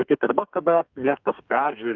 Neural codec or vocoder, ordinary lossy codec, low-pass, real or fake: codec, 32 kHz, 1.9 kbps, SNAC; Opus, 24 kbps; 7.2 kHz; fake